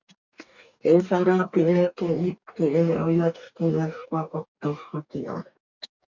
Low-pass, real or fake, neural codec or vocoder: 7.2 kHz; fake; codec, 44.1 kHz, 2.6 kbps, DAC